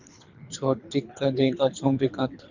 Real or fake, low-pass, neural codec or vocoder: fake; 7.2 kHz; codec, 24 kHz, 3 kbps, HILCodec